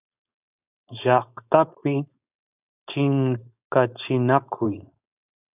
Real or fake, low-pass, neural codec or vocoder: fake; 3.6 kHz; codec, 16 kHz, 4.8 kbps, FACodec